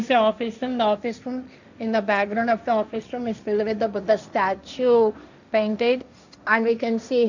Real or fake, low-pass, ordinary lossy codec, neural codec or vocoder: fake; 7.2 kHz; none; codec, 16 kHz, 1.1 kbps, Voila-Tokenizer